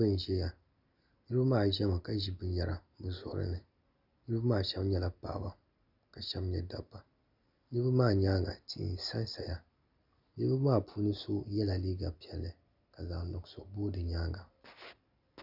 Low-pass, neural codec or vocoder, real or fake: 5.4 kHz; none; real